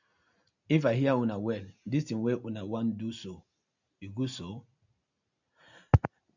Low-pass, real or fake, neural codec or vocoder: 7.2 kHz; real; none